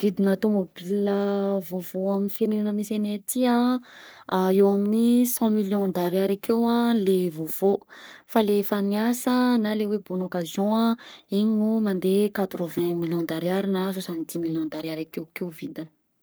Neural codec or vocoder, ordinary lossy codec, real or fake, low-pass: codec, 44.1 kHz, 3.4 kbps, Pupu-Codec; none; fake; none